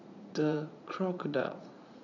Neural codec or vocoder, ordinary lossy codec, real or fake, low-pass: vocoder, 44.1 kHz, 80 mel bands, Vocos; none; fake; 7.2 kHz